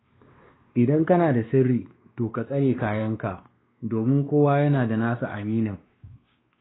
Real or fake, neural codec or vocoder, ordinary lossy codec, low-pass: fake; codec, 16 kHz, 2 kbps, X-Codec, WavLM features, trained on Multilingual LibriSpeech; AAC, 16 kbps; 7.2 kHz